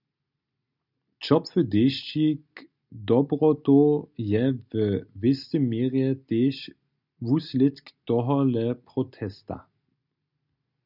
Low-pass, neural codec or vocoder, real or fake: 5.4 kHz; none; real